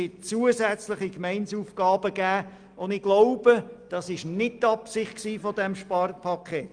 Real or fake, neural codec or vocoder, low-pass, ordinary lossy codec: real; none; 9.9 kHz; Opus, 32 kbps